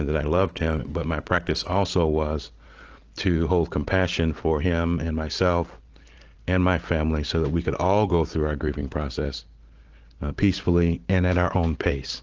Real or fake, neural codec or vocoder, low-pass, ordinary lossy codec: real; none; 7.2 kHz; Opus, 16 kbps